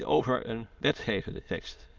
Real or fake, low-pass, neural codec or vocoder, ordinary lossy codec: fake; 7.2 kHz; autoencoder, 22.05 kHz, a latent of 192 numbers a frame, VITS, trained on many speakers; Opus, 24 kbps